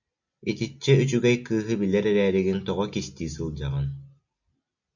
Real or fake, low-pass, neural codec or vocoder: real; 7.2 kHz; none